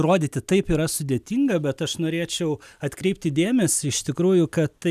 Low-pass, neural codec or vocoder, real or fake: 14.4 kHz; none; real